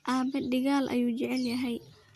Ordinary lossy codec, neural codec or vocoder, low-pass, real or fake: Opus, 64 kbps; none; 14.4 kHz; real